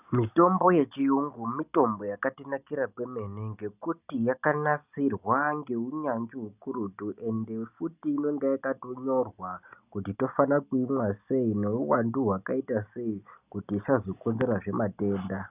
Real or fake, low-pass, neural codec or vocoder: real; 3.6 kHz; none